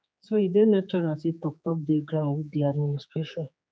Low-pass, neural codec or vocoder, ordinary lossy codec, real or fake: none; codec, 16 kHz, 4 kbps, X-Codec, HuBERT features, trained on general audio; none; fake